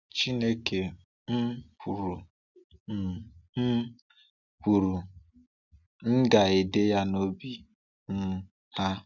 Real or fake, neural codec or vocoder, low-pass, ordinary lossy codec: real; none; 7.2 kHz; none